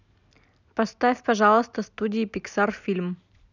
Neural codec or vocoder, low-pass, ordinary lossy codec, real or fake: none; 7.2 kHz; none; real